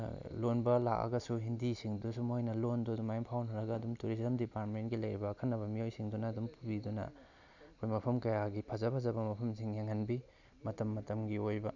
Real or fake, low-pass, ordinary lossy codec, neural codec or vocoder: real; 7.2 kHz; none; none